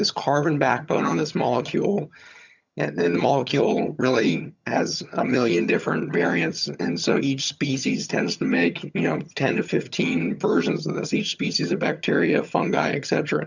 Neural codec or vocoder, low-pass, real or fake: vocoder, 22.05 kHz, 80 mel bands, HiFi-GAN; 7.2 kHz; fake